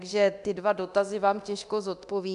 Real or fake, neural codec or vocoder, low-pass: fake; codec, 24 kHz, 0.9 kbps, DualCodec; 10.8 kHz